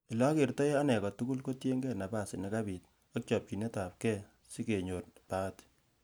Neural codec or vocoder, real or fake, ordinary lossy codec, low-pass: none; real; none; none